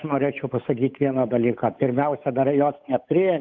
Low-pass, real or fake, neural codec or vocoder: 7.2 kHz; fake; codec, 16 kHz, 8 kbps, FunCodec, trained on Chinese and English, 25 frames a second